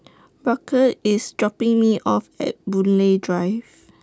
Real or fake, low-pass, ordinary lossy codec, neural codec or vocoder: real; none; none; none